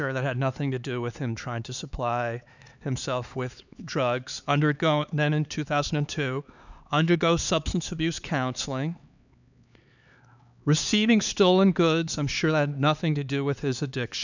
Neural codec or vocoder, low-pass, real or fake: codec, 16 kHz, 4 kbps, X-Codec, HuBERT features, trained on LibriSpeech; 7.2 kHz; fake